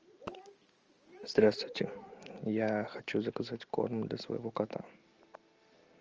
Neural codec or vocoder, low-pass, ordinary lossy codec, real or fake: none; 7.2 kHz; Opus, 24 kbps; real